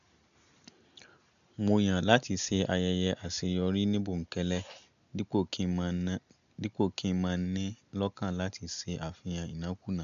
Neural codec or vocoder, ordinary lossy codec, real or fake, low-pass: none; none; real; 7.2 kHz